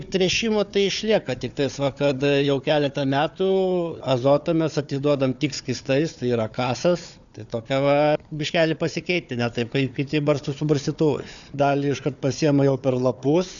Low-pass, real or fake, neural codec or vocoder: 7.2 kHz; fake; codec, 16 kHz, 4 kbps, FunCodec, trained on Chinese and English, 50 frames a second